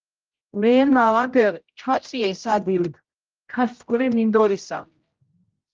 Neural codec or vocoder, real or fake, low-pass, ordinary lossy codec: codec, 16 kHz, 0.5 kbps, X-Codec, HuBERT features, trained on general audio; fake; 7.2 kHz; Opus, 32 kbps